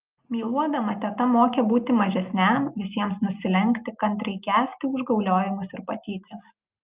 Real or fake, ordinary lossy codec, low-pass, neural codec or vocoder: real; Opus, 24 kbps; 3.6 kHz; none